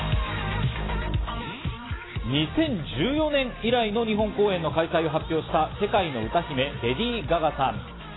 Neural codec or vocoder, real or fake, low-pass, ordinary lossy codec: none; real; 7.2 kHz; AAC, 16 kbps